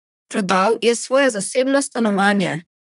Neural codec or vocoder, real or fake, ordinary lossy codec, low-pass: codec, 24 kHz, 1 kbps, SNAC; fake; none; 10.8 kHz